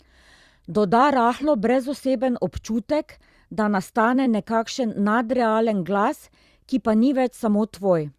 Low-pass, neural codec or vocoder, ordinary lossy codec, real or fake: 14.4 kHz; vocoder, 44.1 kHz, 128 mel bands every 256 samples, BigVGAN v2; Opus, 64 kbps; fake